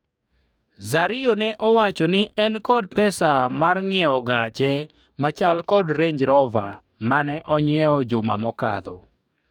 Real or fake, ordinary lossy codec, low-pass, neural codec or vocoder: fake; none; 19.8 kHz; codec, 44.1 kHz, 2.6 kbps, DAC